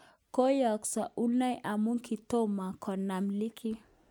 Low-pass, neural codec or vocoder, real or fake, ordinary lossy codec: none; none; real; none